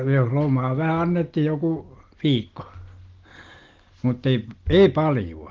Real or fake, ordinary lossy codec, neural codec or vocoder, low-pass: real; Opus, 16 kbps; none; 7.2 kHz